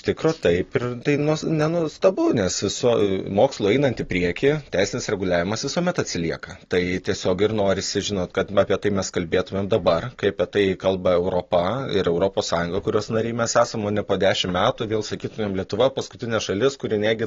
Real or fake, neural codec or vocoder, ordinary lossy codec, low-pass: real; none; AAC, 32 kbps; 7.2 kHz